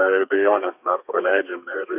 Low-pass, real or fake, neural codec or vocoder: 3.6 kHz; fake; codec, 32 kHz, 1.9 kbps, SNAC